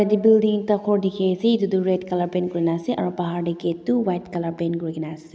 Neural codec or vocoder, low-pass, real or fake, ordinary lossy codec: none; none; real; none